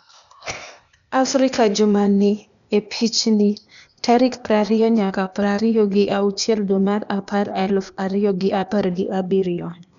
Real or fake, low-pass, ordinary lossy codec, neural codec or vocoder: fake; 7.2 kHz; none; codec, 16 kHz, 0.8 kbps, ZipCodec